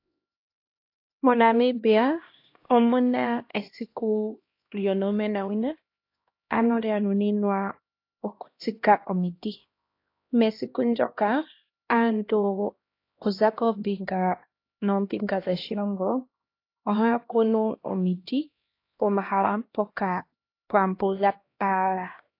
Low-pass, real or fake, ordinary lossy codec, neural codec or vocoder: 5.4 kHz; fake; AAC, 32 kbps; codec, 16 kHz, 1 kbps, X-Codec, HuBERT features, trained on LibriSpeech